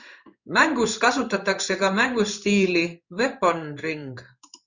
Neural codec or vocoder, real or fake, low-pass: codec, 16 kHz in and 24 kHz out, 1 kbps, XY-Tokenizer; fake; 7.2 kHz